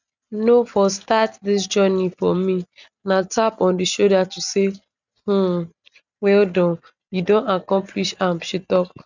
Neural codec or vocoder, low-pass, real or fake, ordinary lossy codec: none; 7.2 kHz; real; none